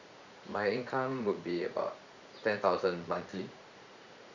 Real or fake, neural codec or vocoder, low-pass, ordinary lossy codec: fake; vocoder, 44.1 kHz, 80 mel bands, Vocos; 7.2 kHz; none